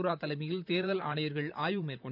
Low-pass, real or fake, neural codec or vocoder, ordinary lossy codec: 5.4 kHz; fake; vocoder, 44.1 kHz, 128 mel bands, Pupu-Vocoder; none